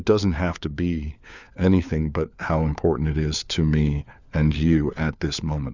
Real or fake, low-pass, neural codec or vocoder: fake; 7.2 kHz; codec, 16 kHz, 4 kbps, FunCodec, trained on LibriTTS, 50 frames a second